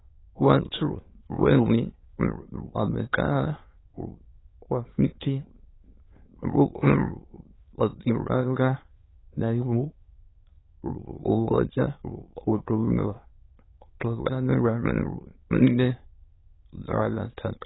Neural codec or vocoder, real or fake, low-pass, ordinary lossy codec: autoencoder, 22.05 kHz, a latent of 192 numbers a frame, VITS, trained on many speakers; fake; 7.2 kHz; AAC, 16 kbps